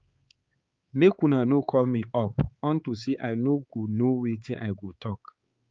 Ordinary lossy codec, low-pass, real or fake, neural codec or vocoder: Opus, 32 kbps; 7.2 kHz; fake; codec, 16 kHz, 4 kbps, X-Codec, HuBERT features, trained on balanced general audio